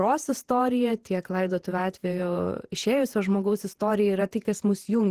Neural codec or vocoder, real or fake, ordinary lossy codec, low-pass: vocoder, 48 kHz, 128 mel bands, Vocos; fake; Opus, 16 kbps; 14.4 kHz